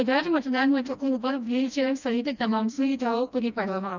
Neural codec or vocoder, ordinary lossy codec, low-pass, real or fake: codec, 16 kHz, 1 kbps, FreqCodec, smaller model; none; 7.2 kHz; fake